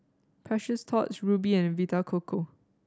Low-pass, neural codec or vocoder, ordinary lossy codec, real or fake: none; none; none; real